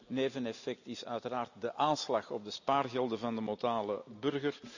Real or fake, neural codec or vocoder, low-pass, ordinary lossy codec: real; none; 7.2 kHz; none